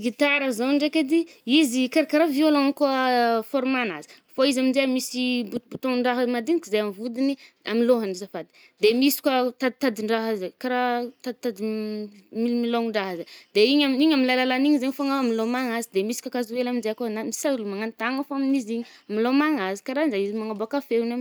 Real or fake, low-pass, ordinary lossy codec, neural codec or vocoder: real; none; none; none